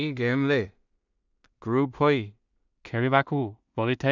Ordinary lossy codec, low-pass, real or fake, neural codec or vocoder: none; 7.2 kHz; fake; codec, 16 kHz in and 24 kHz out, 0.4 kbps, LongCat-Audio-Codec, two codebook decoder